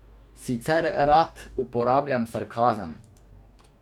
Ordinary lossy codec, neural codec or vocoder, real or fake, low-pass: none; codec, 44.1 kHz, 2.6 kbps, DAC; fake; 19.8 kHz